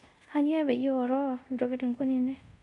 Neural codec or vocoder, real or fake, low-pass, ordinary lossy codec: codec, 24 kHz, 0.5 kbps, DualCodec; fake; 10.8 kHz; MP3, 64 kbps